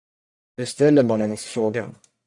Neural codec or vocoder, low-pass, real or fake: codec, 44.1 kHz, 1.7 kbps, Pupu-Codec; 10.8 kHz; fake